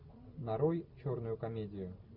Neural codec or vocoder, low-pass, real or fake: none; 5.4 kHz; real